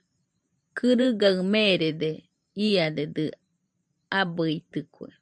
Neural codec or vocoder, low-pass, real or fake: vocoder, 44.1 kHz, 128 mel bands every 512 samples, BigVGAN v2; 9.9 kHz; fake